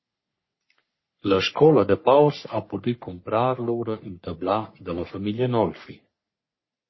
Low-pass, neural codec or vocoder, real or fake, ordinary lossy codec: 7.2 kHz; codec, 44.1 kHz, 3.4 kbps, Pupu-Codec; fake; MP3, 24 kbps